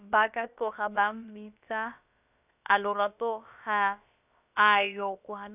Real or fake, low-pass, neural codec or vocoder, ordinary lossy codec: fake; 3.6 kHz; codec, 16 kHz, about 1 kbps, DyCAST, with the encoder's durations; none